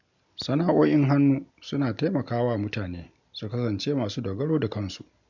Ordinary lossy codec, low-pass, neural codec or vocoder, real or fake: none; 7.2 kHz; none; real